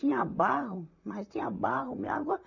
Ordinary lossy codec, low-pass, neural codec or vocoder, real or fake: none; 7.2 kHz; vocoder, 44.1 kHz, 128 mel bands, Pupu-Vocoder; fake